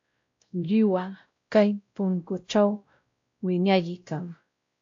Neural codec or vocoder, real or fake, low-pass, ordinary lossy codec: codec, 16 kHz, 0.5 kbps, X-Codec, WavLM features, trained on Multilingual LibriSpeech; fake; 7.2 kHz; AAC, 48 kbps